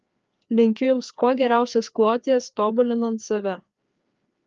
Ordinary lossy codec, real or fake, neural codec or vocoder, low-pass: Opus, 24 kbps; fake; codec, 16 kHz, 2 kbps, FreqCodec, larger model; 7.2 kHz